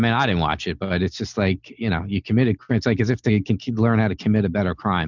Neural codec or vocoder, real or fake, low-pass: none; real; 7.2 kHz